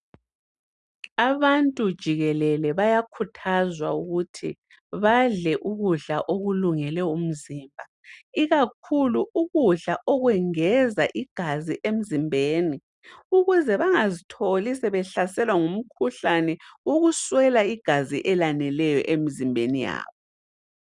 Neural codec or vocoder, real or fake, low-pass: none; real; 10.8 kHz